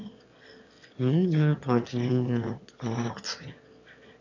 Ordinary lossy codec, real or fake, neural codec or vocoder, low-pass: none; fake; autoencoder, 22.05 kHz, a latent of 192 numbers a frame, VITS, trained on one speaker; 7.2 kHz